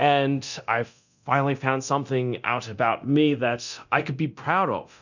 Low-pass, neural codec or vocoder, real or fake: 7.2 kHz; codec, 24 kHz, 0.9 kbps, DualCodec; fake